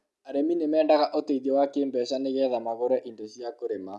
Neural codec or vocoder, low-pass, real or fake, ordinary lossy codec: none; none; real; none